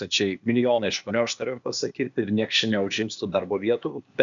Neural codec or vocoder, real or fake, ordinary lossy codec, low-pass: codec, 16 kHz, 0.8 kbps, ZipCodec; fake; MP3, 96 kbps; 7.2 kHz